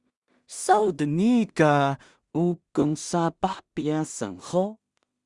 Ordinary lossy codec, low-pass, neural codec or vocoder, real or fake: Opus, 64 kbps; 10.8 kHz; codec, 16 kHz in and 24 kHz out, 0.4 kbps, LongCat-Audio-Codec, two codebook decoder; fake